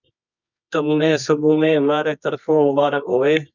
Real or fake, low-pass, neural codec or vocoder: fake; 7.2 kHz; codec, 24 kHz, 0.9 kbps, WavTokenizer, medium music audio release